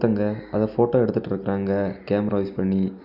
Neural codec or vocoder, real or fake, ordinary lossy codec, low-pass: none; real; none; 5.4 kHz